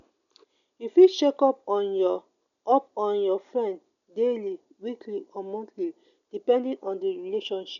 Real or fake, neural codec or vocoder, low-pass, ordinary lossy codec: real; none; 7.2 kHz; none